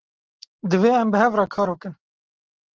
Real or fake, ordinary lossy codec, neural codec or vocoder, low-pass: real; Opus, 16 kbps; none; 7.2 kHz